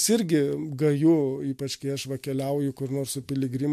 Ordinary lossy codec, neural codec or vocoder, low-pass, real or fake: MP3, 64 kbps; none; 14.4 kHz; real